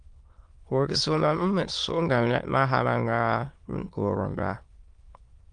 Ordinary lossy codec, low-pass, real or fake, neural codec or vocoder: Opus, 32 kbps; 9.9 kHz; fake; autoencoder, 22.05 kHz, a latent of 192 numbers a frame, VITS, trained on many speakers